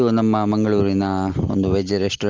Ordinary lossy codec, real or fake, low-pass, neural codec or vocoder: Opus, 16 kbps; real; 7.2 kHz; none